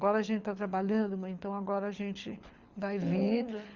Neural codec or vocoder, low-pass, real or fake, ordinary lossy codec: codec, 24 kHz, 6 kbps, HILCodec; 7.2 kHz; fake; Opus, 64 kbps